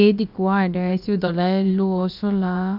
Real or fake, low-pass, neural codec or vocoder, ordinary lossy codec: fake; 5.4 kHz; codec, 16 kHz, about 1 kbps, DyCAST, with the encoder's durations; none